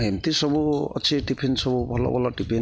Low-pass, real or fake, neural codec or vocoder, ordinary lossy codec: none; real; none; none